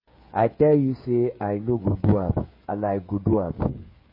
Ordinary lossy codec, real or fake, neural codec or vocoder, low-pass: MP3, 24 kbps; fake; codec, 16 kHz, 16 kbps, FreqCodec, smaller model; 5.4 kHz